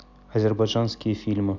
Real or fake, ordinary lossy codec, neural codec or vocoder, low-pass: real; none; none; 7.2 kHz